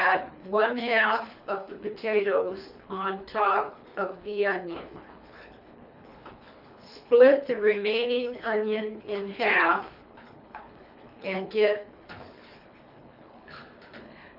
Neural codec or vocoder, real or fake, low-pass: codec, 24 kHz, 3 kbps, HILCodec; fake; 5.4 kHz